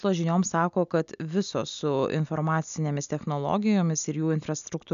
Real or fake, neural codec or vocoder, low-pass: real; none; 7.2 kHz